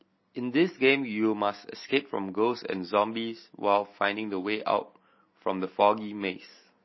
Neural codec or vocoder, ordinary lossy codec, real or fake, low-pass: none; MP3, 24 kbps; real; 7.2 kHz